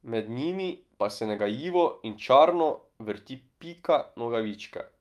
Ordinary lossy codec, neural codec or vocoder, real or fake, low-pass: Opus, 32 kbps; autoencoder, 48 kHz, 128 numbers a frame, DAC-VAE, trained on Japanese speech; fake; 14.4 kHz